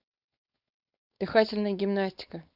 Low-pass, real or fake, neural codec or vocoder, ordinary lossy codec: 5.4 kHz; fake; codec, 16 kHz, 4.8 kbps, FACodec; Opus, 64 kbps